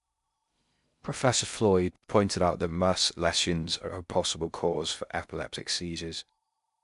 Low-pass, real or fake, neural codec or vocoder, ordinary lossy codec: 10.8 kHz; fake; codec, 16 kHz in and 24 kHz out, 0.6 kbps, FocalCodec, streaming, 2048 codes; none